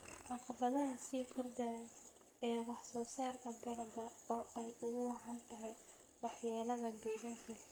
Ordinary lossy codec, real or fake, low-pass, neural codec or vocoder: none; fake; none; codec, 44.1 kHz, 3.4 kbps, Pupu-Codec